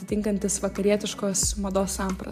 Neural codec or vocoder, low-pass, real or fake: vocoder, 44.1 kHz, 128 mel bands every 512 samples, BigVGAN v2; 14.4 kHz; fake